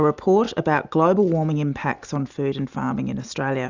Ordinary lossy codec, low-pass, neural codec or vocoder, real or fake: Opus, 64 kbps; 7.2 kHz; none; real